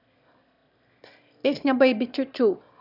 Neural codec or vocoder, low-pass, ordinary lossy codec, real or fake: autoencoder, 22.05 kHz, a latent of 192 numbers a frame, VITS, trained on one speaker; 5.4 kHz; none; fake